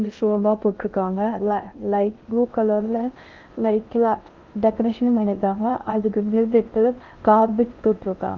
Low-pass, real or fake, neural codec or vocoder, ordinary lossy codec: 7.2 kHz; fake; codec, 16 kHz in and 24 kHz out, 0.6 kbps, FocalCodec, streaming, 2048 codes; Opus, 24 kbps